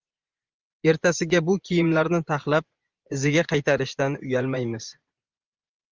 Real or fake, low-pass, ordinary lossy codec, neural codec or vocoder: fake; 7.2 kHz; Opus, 32 kbps; vocoder, 24 kHz, 100 mel bands, Vocos